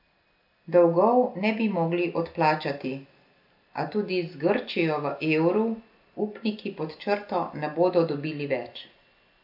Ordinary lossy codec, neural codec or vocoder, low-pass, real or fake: MP3, 48 kbps; none; 5.4 kHz; real